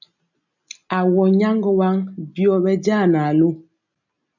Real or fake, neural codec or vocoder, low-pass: real; none; 7.2 kHz